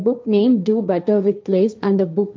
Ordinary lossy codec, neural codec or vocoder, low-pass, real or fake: none; codec, 16 kHz, 1.1 kbps, Voila-Tokenizer; none; fake